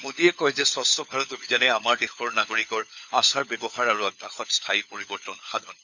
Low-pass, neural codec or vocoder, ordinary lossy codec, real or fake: 7.2 kHz; codec, 16 kHz, 4 kbps, FunCodec, trained on LibriTTS, 50 frames a second; none; fake